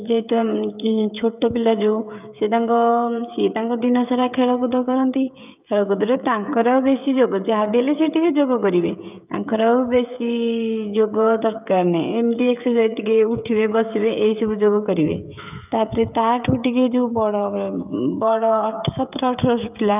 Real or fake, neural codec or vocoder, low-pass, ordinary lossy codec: fake; codec, 16 kHz, 16 kbps, FreqCodec, smaller model; 3.6 kHz; none